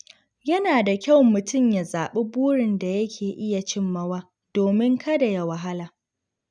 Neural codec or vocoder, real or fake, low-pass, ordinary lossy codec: none; real; 9.9 kHz; none